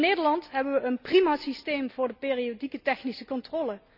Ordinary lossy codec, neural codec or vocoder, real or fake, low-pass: none; none; real; 5.4 kHz